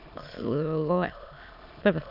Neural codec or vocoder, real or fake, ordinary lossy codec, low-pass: autoencoder, 22.05 kHz, a latent of 192 numbers a frame, VITS, trained on many speakers; fake; none; 5.4 kHz